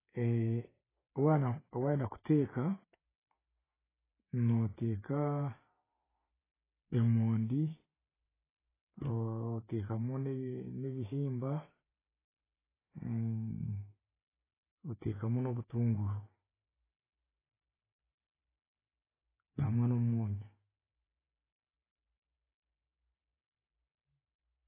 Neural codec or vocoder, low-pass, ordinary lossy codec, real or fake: none; 3.6 kHz; AAC, 16 kbps; real